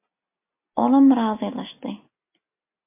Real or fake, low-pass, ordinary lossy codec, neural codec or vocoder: real; 3.6 kHz; MP3, 24 kbps; none